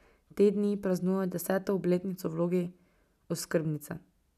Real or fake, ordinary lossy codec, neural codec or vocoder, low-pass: real; none; none; 14.4 kHz